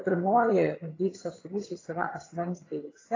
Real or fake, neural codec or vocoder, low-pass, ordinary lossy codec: fake; vocoder, 22.05 kHz, 80 mel bands, HiFi-GAN; 7.2 kHz; AAC, 32 kbps